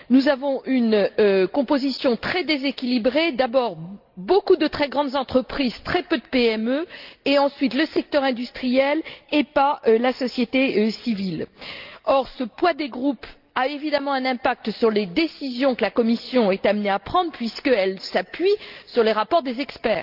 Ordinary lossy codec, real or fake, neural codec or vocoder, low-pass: Opus, 32 kbps; real; none; 5.4 kHz